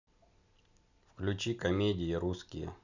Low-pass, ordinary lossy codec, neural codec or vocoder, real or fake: 7.2 kHz; none; none; real